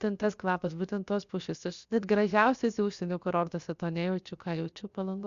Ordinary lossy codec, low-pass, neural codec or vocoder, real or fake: Opus, 64 kbps; 7.2 kHz; codec, 16 kHz, 0.7 kbps, FocalCodec; fake